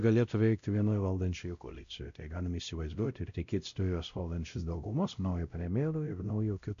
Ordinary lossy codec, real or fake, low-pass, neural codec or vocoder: MP3, 64 kbps; fake; 7.2 kHz; codec, 16 kHz, 0.5 kbps, X-Codec, WavLM features, trained on Multilingual LibriSpeech